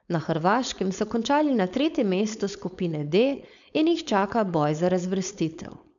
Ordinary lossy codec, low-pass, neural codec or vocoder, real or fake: none; 7.2 kHz; codec, 16 kHz, 4.8 kbps, FACodec; fake